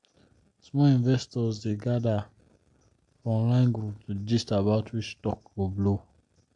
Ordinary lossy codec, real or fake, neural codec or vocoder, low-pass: AAC, 64 kbps; real; none; 10.8 kHz